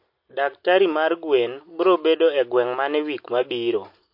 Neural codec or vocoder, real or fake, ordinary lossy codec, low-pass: none; real; MP3, 32 kbps; 5.4 kHz